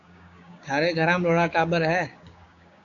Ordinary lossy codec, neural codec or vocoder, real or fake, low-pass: AAC, 48 kbps; codec, 16 kHz, 6 kbps, DAC; fake; 7.2 kHz